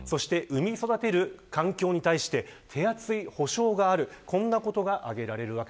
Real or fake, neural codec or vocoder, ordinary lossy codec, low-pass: real; none; none; none